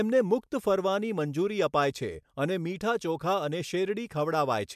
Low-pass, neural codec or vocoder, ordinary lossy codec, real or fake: 14.4 kHz; none; MP3, 96 kbps; real